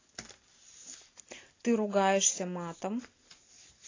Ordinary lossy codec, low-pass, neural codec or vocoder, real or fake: AAC, 32 kbps; 7.2 kHz; none; real